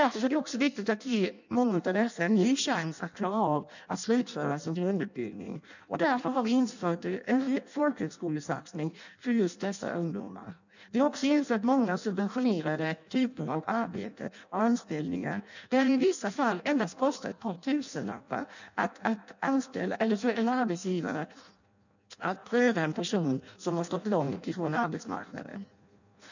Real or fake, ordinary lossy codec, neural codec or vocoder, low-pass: fake; none; codec, 16 kHz in and 24 kHz out, 0.6 kbps, FireRedTTS-2 codec; 7.2 kHz